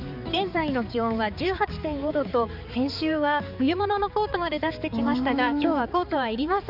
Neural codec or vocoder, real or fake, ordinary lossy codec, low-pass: codec, 16 kHz, 4 kbps, X-Codec, HuBERT features, trained on balanced general audio; fake; none; 5.4 kHz